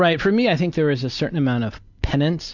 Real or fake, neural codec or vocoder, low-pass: real; none; 7.2 kHz